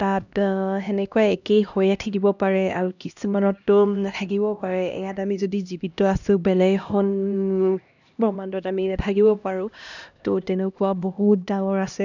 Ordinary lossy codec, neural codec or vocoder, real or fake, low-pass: none; codec, 16 kHz, 1 kbps, X-Codec, HuBERT features, trained on LibriSpeech; fake; 7.2 kHz